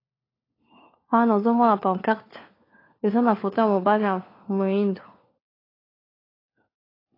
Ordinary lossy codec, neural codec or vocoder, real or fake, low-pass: AAC, 24 kbps; codec, 16 kHz, 4 kbps, FunCodec, trained on LibriTTS, 50 frames a second; fake; 5.4 kHz